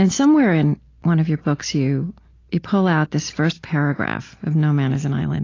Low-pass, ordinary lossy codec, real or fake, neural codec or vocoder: 7.2 kHz; AAC, 32 kbps; real; none